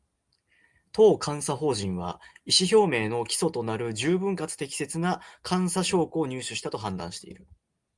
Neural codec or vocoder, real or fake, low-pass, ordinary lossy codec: none; real; 10.8 kHz; Opus, 32 kbps